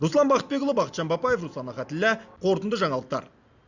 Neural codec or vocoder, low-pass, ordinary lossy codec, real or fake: none; 7.2 kHz; Opus, 64 kbps; real